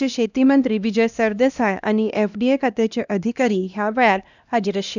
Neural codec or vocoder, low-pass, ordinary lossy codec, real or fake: codec, 16 kHz, 1 kbps, X-Codec, HuBERT features, trained on LibriSpeech; 7.2 kHz; none; fake